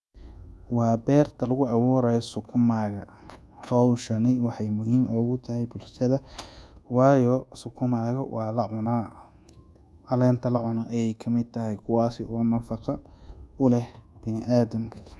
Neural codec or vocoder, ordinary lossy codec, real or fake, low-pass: codec, 24 kHz, 1.2 kbps, DualCodec; none; fake; none